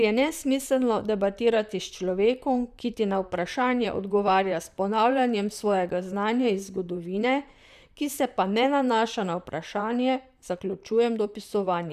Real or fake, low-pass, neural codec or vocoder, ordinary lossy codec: fake; 14.4 kHz; vocoder, 44.1 kHz, 128 mel bands, Pupu-Vocoder; none